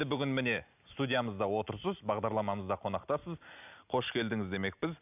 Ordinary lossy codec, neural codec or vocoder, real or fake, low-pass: none; none; real; 3.6 kHz